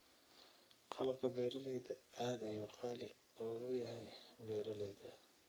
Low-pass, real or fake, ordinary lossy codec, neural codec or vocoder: none; fake; none; codec, 44.1 kHz, 3.4 kbps, Pupu-Codec